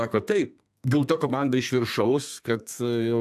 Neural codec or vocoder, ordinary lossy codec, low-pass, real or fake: codec, 32 kHz, 1.9 kbps, SNAC; AAC, 96 kbps; 14.4 kHz; fake